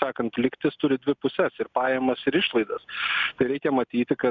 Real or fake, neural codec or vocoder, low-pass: real; none; 7.2 kHz